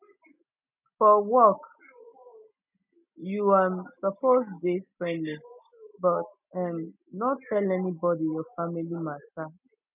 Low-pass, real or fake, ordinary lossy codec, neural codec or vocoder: 3.6 kHz; real; none; none